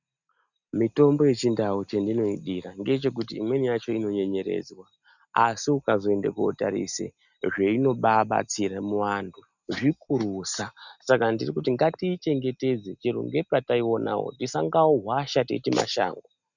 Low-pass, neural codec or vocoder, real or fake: 7.2 kHz; none; real